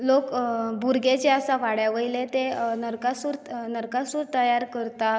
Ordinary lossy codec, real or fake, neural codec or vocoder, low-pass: none; real; none; none